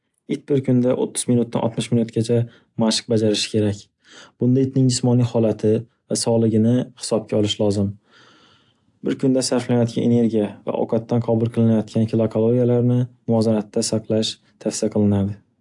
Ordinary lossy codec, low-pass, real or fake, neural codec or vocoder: AAC, 64 kbps; 10.8 kHz; real; none